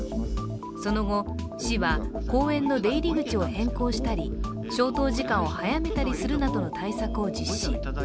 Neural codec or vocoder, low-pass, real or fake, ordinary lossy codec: none; none; real; none